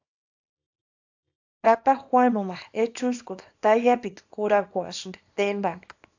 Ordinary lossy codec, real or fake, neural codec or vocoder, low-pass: AAC, 48 kbps; fake; codec, 24 kHz, 0.9 kbps, WavTokenizer, small release; 7.2 kHz